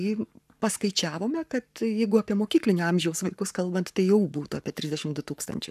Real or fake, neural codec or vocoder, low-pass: fake; codec, 44.1 kHz, 7.8 kbps, Pupu-Codec; 14.4 kHz